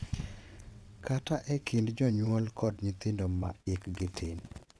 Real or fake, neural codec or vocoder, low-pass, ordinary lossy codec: fake; vocoder, 22.05 kHz, 80 mel bands, WaveNeXt; none; none